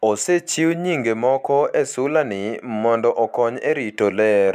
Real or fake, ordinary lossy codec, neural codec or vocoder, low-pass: real; none; none; 14.4 kHz